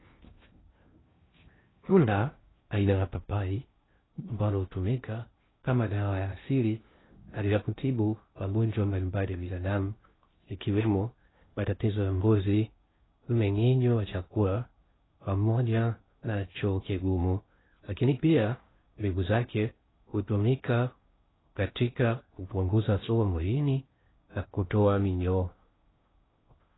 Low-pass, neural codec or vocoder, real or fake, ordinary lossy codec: 7.2 kHz; codec, 16 kHz in and 24 kHz out, 0.6 kbps, FocalCodec, streaming, 2048 codes; fake; AAC, 16 kbps